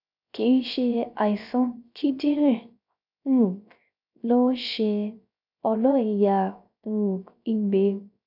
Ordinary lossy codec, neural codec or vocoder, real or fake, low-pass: none; codec, 16 kHz, 0.3 kbps, FocalCodec; fake; 5.4 kHz